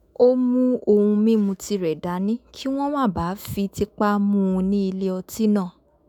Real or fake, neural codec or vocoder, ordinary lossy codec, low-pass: fake; autoencoder, 48 kHz, 128 numbers a frame, DAC-VAE, trained on Japanese speech; none; 19.8 kHz